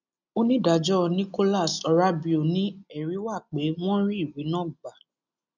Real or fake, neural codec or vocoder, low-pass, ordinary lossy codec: real; none; 7.2 kHz; none